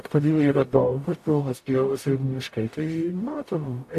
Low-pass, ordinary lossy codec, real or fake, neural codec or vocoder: 14.4 kHz; AAC, 64 kbps; fake; codec, 44.1 kHz, 0.9 kbps, DAC